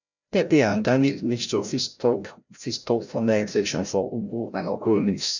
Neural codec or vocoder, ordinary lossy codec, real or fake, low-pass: codec, 16 kHz, 0.5 kbps, FreqCodec, larger model; none; fake; 7.2 kHz